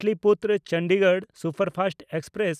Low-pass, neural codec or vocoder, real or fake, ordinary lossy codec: 14.4 kHz; none; real; none